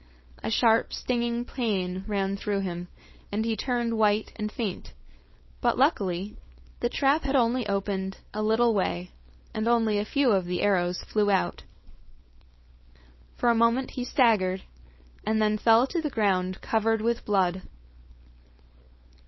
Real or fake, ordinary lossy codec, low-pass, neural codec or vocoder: fake; MP3, 24 kbps; 7.2 kHz; codec, 16 kHz, 4.8 kbps, FACodec